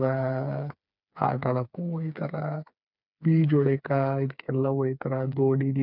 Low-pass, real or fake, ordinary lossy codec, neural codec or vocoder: 5.4 kHz; fake; none; codec, 16 kHz, 4 kbps, FreqCodec, smaller model